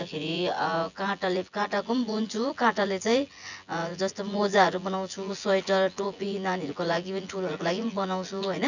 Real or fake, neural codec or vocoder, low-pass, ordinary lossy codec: fake; vocoder, 24 kHz, 100 mel bands, Vocos; 7.2 kHz; none